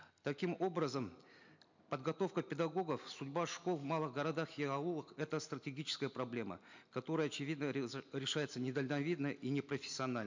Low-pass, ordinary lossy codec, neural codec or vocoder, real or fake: 7.2 kHz; MP3, 64 kbps; none; real